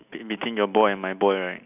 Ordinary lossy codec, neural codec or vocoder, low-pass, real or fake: none; none; 3.6 kHz; real